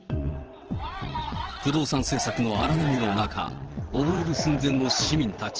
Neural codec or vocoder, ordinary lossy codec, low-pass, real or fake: vocoder, 22.05 kHz, 80 mel bands, WaveNeXt; Opus, 16 kbps; 7.2 kHz; fake